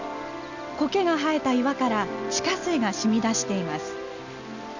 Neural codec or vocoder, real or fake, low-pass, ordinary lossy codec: none; real; 7.2 kHz; none